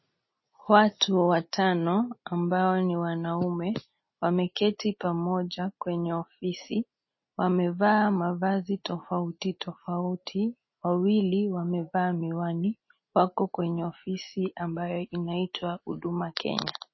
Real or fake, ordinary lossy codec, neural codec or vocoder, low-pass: real; MP3, 24 kbps; none; 7.2 kHz